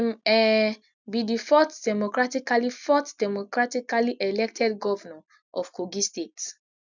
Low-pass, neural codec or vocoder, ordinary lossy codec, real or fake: 7.2 kHz; none; none; real